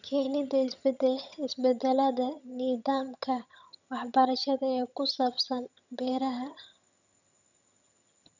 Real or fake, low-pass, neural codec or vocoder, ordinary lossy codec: fake; 7.2 kHz; vocoder, 22.05 kHz, 80 mel bands, HiFi-GAN; none